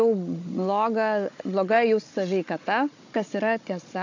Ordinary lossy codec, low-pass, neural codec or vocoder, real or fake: AAC, 48 kbps; 7.2 kHz; codec, 16 kHz, 16 kbps, FunCodec, trained on Chinese and English, 50 frames a second; fake